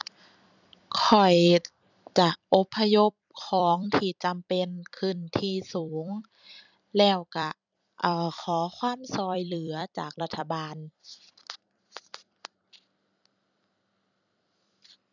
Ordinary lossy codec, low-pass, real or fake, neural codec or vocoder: none; 7.2 kHz; fake; vocoder, 44.1 kHz, 128 mel bands every 256 samples, BigVGAN v2